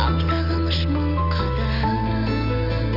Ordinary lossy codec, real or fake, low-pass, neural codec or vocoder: none; real; 5.4 kHz; none